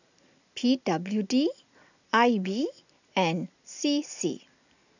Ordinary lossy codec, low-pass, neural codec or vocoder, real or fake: none; 7.2 kHz; none; real